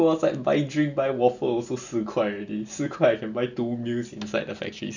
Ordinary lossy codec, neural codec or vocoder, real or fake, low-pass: none; none; real; 7.2 kHz